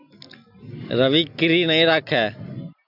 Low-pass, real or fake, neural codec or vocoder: 5.4 kHz; real; none